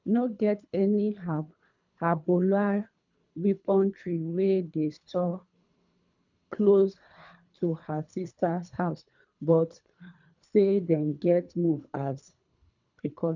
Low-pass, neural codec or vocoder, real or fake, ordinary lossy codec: 7.2 kHz; codec, 24 kHz, 3 kbps, HILCodec; fake; none